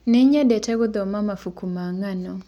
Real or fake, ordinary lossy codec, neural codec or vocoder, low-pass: real; none; none; 19.8 kHz